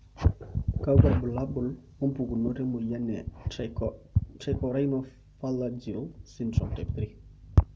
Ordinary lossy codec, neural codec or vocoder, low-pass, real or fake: none; none; none; real